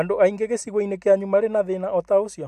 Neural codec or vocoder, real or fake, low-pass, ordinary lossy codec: none; real; 14.4 kHz; AAC, 96 kbps